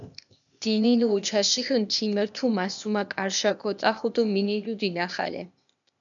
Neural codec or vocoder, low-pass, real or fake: codec, 16 kHz, 0.8 kbps, ZipCodec; 7.2 kHz; fake